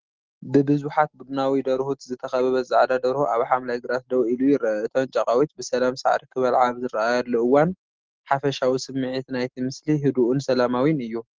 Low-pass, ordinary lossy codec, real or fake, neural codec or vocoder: 7.2 kHz; Opus, 16 kbps; real; none